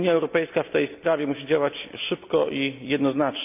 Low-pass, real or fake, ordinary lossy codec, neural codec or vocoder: 3.6 kHz; real; none; none